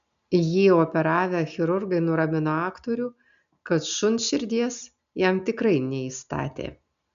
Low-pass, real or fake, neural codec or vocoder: 7.2 kHz; real; none